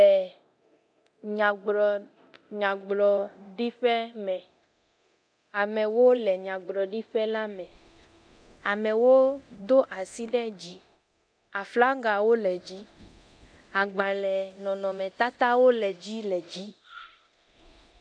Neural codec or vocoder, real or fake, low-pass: codec, 24 kHz, 0.9 kbps, DualCodec; fake; 9.9 kHz